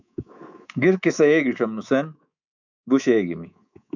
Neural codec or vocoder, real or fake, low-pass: codec, 24 kHz, 3.1 kbps, DualCodec; fake; 7.2 kHz